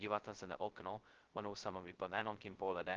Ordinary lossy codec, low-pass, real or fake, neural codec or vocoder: Opus, 16 kbps; 7.2 kHz; fake; codec, 16 kHz, 0.2 kbps, FocalCodec